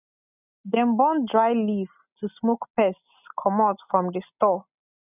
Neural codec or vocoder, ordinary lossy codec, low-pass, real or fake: none; none; 3.6 kHz; real